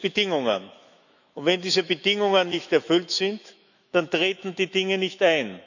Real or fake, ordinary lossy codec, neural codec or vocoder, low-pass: fake; none; autoencoder, 48 kHz, 128 numbers a frame, DAC-VAE, trained on Japanese speech; 7.2 kHz